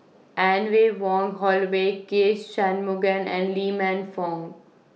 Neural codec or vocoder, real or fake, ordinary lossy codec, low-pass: none; real; none; none